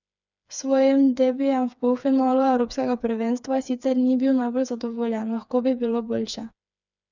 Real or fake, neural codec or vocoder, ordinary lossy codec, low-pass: fake; codec, 16 kHz, 4 kbps, FreqCodec, smaller model; none; 7.2 kHz